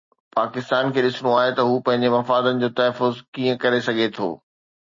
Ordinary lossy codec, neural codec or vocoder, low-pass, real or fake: MP3, 32 kbps; none; 7.2 kHz; real